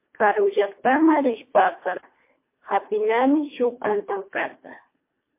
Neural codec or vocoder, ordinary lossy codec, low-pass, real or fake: codec, 24 kHz, 1.5 kbps, HILCodec; MP3, 24 kbps; 3.6 kHz; fake